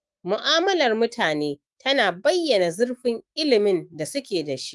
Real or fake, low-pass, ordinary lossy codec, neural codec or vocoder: fake; 10.8 kHz; Opus, 24 kbps; autoencoder, 48 kHz, 128 numbers a frame, DAC-VAE, trained on Japanese speech